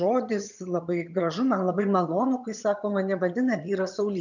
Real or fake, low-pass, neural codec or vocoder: fake; 7.2 kHz; vocoder, 22.05 kHz, 80 mel bands, HiFi-GAN